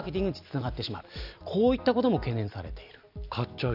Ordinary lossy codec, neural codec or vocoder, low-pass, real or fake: none; none; 5.4 kHz; real